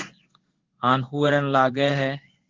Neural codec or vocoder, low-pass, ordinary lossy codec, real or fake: codec, 16 kHz in and 24 kHz out, 1 kbps, XY-Tokenizer; 7.2 kHz; Opus, 16 kbps; fake